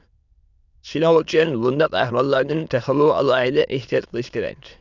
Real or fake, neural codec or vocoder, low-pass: fake; autoencoder, 22.05 kHz, a latent of 192 numbers a frame, VITS, trained on many speakers; 7.2 kHz